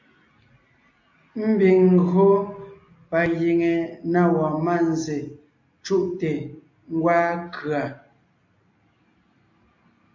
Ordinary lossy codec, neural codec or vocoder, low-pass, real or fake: AAC, 48 kbps; none; 7.2 kHz; real